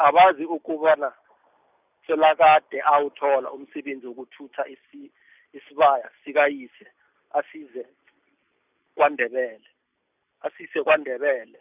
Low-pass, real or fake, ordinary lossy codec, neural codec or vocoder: 3.6 kHz; real; none; none